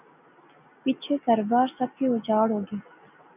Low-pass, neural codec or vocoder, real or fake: 3.6 kHz; none; real